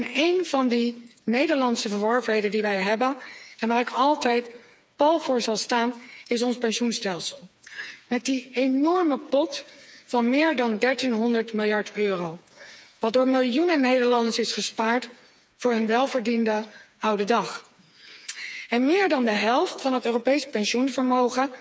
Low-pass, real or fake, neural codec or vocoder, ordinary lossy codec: none; fake; codec, 16 kHz, 4 kbps, FreqCodec, smaller model; none